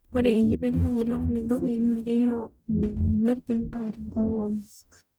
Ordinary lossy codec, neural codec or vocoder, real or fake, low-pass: none; codec, 44.1 kHz, 0.9 kbps, DAC; fake; none